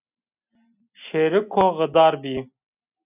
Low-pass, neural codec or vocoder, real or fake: 3.6 kHz; none; real